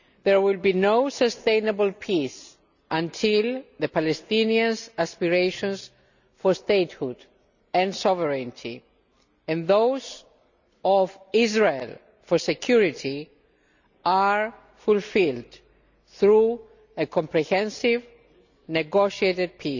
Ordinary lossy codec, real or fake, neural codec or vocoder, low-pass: none; real; none; 7.2 kHz